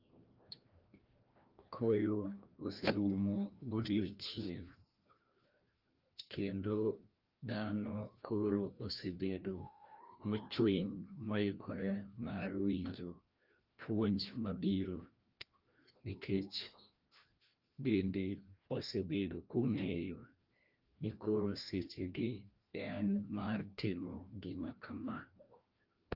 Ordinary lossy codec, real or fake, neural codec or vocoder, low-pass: Opus, 32 kbps; fake; codec, 16 kHz, 1 kbps, FreqCodec, larger model; 5.4 kHz